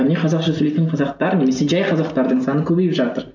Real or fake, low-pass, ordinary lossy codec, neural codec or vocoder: real; 7.2 kHz; none; none